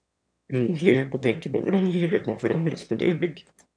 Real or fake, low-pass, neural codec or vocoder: fake; 9.9 kHz; autoencoder, 22.05 kHz, a latent of 192 numbers a frame, VITS, trained on one speaker